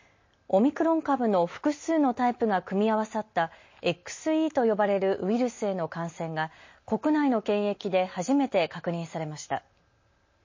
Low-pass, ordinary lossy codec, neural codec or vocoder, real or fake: 7.2 kHz; MP3, 32 kbps; none; real